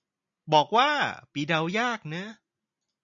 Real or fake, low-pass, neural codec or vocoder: real; 7.2 kHz; none